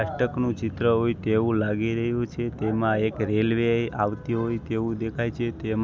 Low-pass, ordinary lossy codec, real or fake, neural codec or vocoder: none; none; real; none